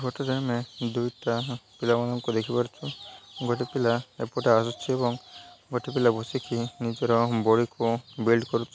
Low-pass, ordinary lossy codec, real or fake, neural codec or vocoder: none; none; real; none